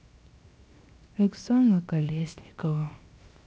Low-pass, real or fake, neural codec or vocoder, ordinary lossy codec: none; fake; codec, 16 kHz, 0.7 kbps, FocalCodec; none